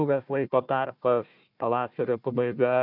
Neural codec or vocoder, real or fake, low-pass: codec, 16 kHz, 1 kbps, FunCodec, trained on Chinese and English, 50 frames a second; fake; 5.4 kHz